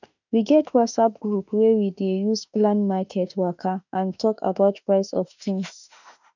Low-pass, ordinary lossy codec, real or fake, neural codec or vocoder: 7.2 kHz; none; fake; autoencoder, 48 kHz, 32 numbers a frame, DAC-VAE, trained on Japanese speech